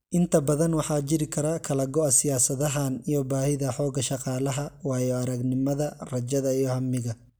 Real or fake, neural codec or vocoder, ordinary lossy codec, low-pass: real; none; none; none